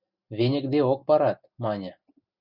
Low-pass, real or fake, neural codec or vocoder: 5.4 kHz; real; none